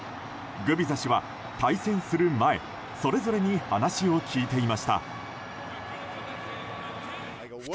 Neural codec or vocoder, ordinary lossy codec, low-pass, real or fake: none; none; none; real